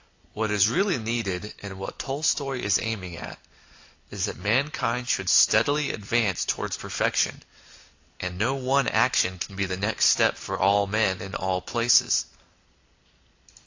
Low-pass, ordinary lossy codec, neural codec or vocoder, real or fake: 7.2 kHz; AAC, 48 kbps; none; real